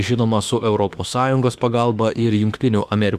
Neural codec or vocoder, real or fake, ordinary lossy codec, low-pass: autoencoder, 48 kHz, 32 numbers a frame, DAC-VAE, trained on Japanese speech; fake; Opus, 64 kbps; 14.4 kHz